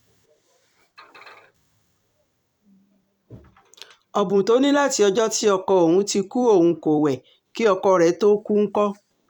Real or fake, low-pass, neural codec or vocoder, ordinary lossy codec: fake; 19.8 kHz; vocoder, 44.1 kHz, 128 mel bands every 256 samples, BigVGAN v2; none